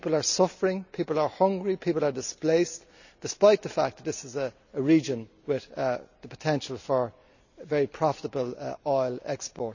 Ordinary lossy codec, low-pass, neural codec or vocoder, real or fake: none; 7.2 kHz; none; real